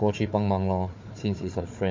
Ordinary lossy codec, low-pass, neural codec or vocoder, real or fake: MP3, 48 kbps; 7.2 kHz; codec, 16 kHz, 4 kbps, FunCodec, trained on Chinese and English, 50 frames a second; fake